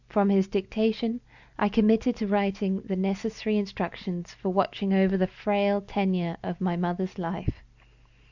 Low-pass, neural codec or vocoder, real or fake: 7.2 kHz; none; real